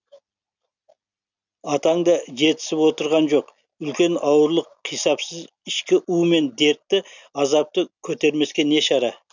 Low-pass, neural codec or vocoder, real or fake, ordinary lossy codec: 7.2 kHz; none; real; none